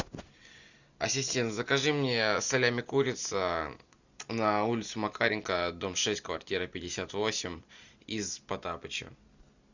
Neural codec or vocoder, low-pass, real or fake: none; 7.2 kHz; real